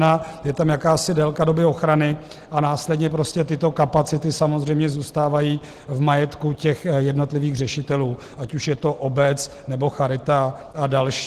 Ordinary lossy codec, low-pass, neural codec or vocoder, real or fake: Opus, 16 kbps; 14.4 kHz; none; real